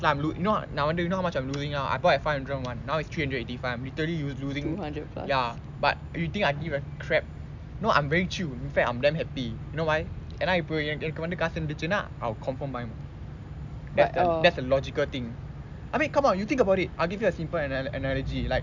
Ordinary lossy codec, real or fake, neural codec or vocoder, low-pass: none; real; none; 7.2 kHz